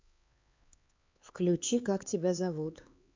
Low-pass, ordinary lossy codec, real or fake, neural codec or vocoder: 7.2 kHz; MP3, 64 kbps; fake; codec, 16 kHz, 4 kbps, X-Codec, HuBERT features, trained on LibriSpeech